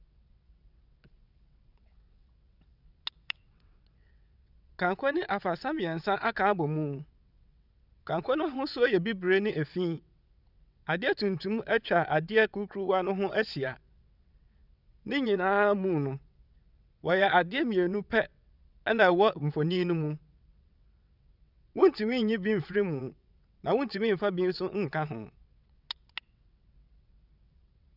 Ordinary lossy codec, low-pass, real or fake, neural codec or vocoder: none; 5.4 kHz; fake; vocoder, 22.05 kHz, 80 mel bands, WaveNeXt